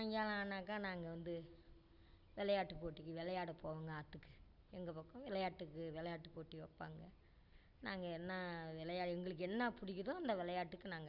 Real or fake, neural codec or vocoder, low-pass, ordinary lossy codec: real; none; 5.4 kHz; Opus, 64 kbps